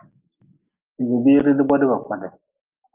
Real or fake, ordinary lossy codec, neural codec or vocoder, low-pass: real; Opus, 32 kbps; none; 3.6 kHz